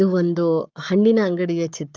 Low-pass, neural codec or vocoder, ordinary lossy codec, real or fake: 7.2 kHz; codec, 44.1 kHz, 7.8 kbps, Pupu-Codec; Opus, 24 kbps; fake